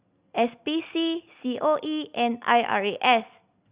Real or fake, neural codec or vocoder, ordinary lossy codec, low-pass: real; none; Opus, 64 kbps; 3.6 kHz